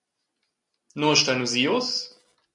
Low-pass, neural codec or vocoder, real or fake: 10.8 kHz; none; real